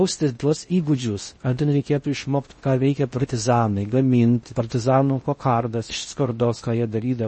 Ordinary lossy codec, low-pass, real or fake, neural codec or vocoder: MP3, 32 kbps; 10.8 kHz; fake; codec, 16 kHz in and 24 kHz out, 0.6 kbps, FocalCodec, streaming, 4096 codes